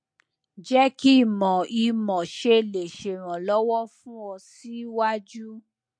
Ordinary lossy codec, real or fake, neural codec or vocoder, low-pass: MP3, 48 kbps; real; none; 9.9 kHz